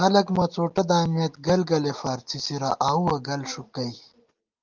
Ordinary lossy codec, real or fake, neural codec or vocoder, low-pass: Opus, 32 kbps; real; none; 7.2 kHz